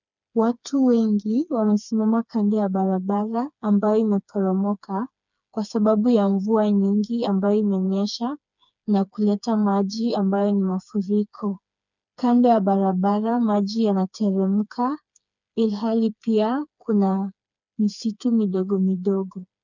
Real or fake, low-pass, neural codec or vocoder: fake; 7.2 kHz; codec, 16 kHz, 4 kbps, FreqCodec, smaller model